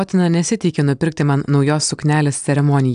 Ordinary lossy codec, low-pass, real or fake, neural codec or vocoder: AAC, 96 kbps; 9.9 kHz; real; none